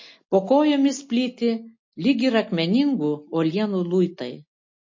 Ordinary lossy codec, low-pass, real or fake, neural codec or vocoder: MP3, 32 kbps; 7.2 kHz; real; none